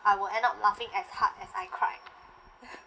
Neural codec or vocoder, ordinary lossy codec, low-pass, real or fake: none; none; none; real